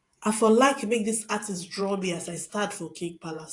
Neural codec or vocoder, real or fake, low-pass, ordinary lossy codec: vocoder, 48 kHz, 128 mel bands, Vocos; fake; 10.8 kHz; AAC, 48 kbps